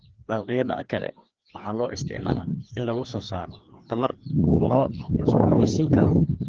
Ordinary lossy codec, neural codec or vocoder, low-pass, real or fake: Opus, 16 kbps; codec, 16 kHz, 2 kbps, FreqCodec, larger model; 7.2 kHz; fake